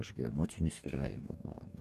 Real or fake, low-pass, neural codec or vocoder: fake; 14.4 kHz; codec, 44.1 kHz, 2.6 kbps, DAC